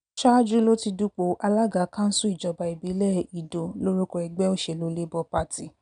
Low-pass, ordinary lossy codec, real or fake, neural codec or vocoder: 9.9 kHz; none; real; none